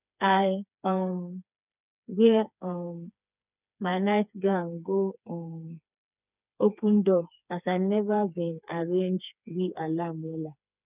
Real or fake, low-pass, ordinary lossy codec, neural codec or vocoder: fake; 3.6 kHz; none; codec, 16 kHz, 4 kbps, FreqCodec, smaller model